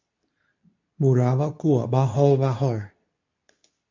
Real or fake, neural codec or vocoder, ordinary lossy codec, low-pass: fake; codec, 24 kHz, 0.9 kbps, WavTokenizer, medium speech release version 2; MP3, 64 kbps; 7.2 kHz